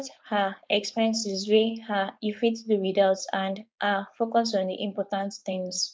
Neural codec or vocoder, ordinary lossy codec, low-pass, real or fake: codec, 16 kHz, 4.8 kbps, FACodec; none; none; fake